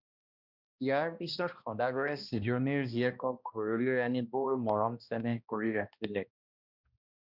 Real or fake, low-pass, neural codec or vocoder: fake; 5.4 kHz; codec, 16 kHz, 1 kbps, X-Codec, HuBERT features, trained on balanced general audio